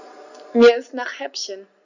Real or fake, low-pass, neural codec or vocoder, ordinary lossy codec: real; 7.2 kHz; none; none